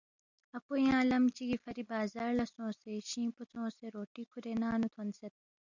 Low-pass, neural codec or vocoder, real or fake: 7.2 kHz; none; real